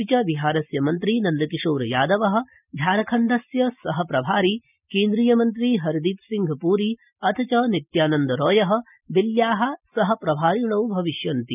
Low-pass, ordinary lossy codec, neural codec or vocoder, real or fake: 3.6 kHz; none; none; real